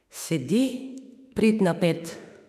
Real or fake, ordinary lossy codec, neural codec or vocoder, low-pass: fake; none; autoencoder, 48 kHz, 32 numbers a frame, DAC-VAE, trained on Japanese speech; 14.4 kHz